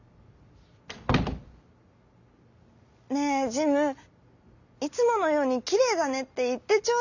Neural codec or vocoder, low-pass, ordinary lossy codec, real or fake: none; 7.2 kHz; none; real